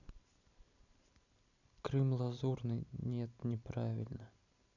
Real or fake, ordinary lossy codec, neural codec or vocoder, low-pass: fake; none; vocoder, 44.1 kHz, 128 mel bands every 256 samples, BigVGAN v2; 7.2 kHz